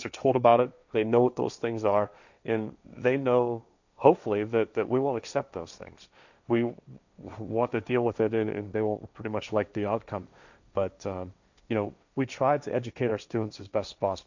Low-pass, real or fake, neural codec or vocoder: 7.2 kHz; fake; codec, 16 kHz, 1.1 kbps, Voila-Tokenizer